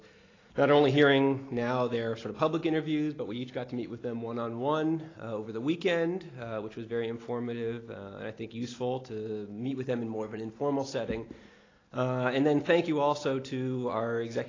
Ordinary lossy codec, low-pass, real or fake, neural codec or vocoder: AAC, 32 kbps; 7.2 kHz; real; none